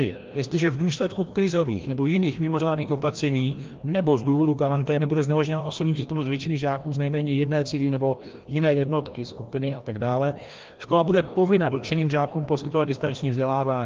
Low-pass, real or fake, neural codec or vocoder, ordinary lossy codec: 7.2 kHz; fake; codec, 16 kHz, 1 kbps, FreqCodec, larger model; Opus, 32 kbps